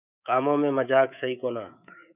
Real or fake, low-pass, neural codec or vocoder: fake; 3.6 kHz; codec, 24 kHz, 3.1 kbps, DualCodec